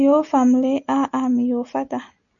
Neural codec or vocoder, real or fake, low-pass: none; real; 7.2 kHz